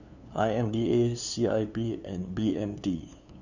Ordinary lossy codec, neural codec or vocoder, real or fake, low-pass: none; codec, 16 kHz, 2 kbps, FunCodec, trained on LibriTTS, 25 frames a second; fake; 7.2 kHz